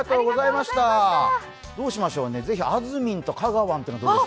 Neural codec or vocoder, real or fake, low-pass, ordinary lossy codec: none; real; none; none